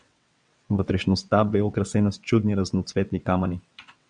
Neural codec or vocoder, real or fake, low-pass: vocoder, 22.05 kHz, 80 mel bands, WaveNeXt; fake; 9.9 kHz